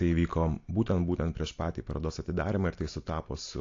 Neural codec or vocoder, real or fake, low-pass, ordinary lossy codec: none; real; 7.2 kHz; AAC, 48 kbps